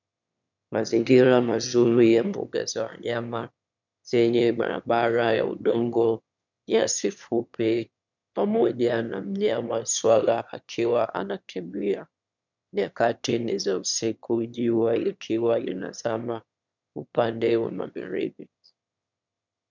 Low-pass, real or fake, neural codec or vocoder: 7.2 kHz; fake; autoencoder, 22.05 kHz, a latent of 192 numbers a frame, VITS, trained on one speaker